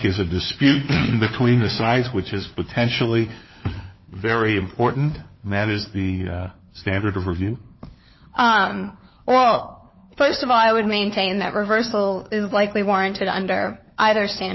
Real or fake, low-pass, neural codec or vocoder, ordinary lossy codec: fake; 7.2 kHz; codec, 16 kHz, 4 kbps, FunCodec, trained on LibriTTS, 50 frames a second; MP3, 24 kbps